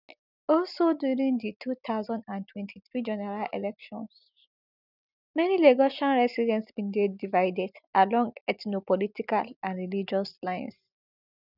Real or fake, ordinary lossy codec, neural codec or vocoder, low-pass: real; none; none; 5.4 kHz